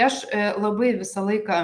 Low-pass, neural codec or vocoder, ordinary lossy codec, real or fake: 9.9 kHz; none; Opus, 32 kbps; real